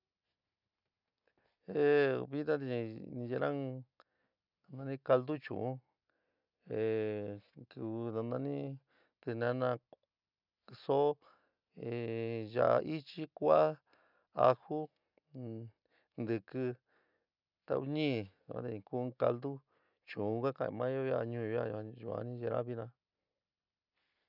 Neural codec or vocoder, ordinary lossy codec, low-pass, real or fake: none; none; 5.4 kHz; real